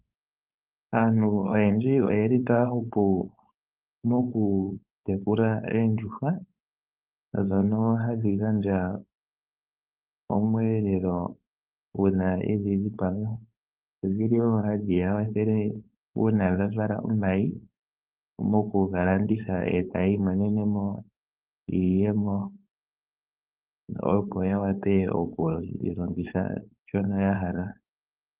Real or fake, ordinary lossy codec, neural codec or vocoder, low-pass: fake; Opus, 32 kbps; codec, 16 kHz, 4.8 kbps, FACodec; 3.6 kHz